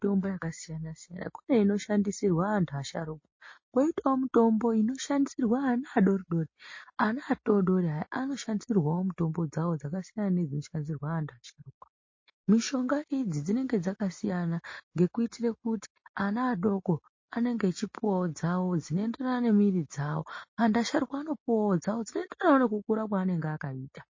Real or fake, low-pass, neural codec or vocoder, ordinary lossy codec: real; 7.2 kHz; none; MP3, 32 kbps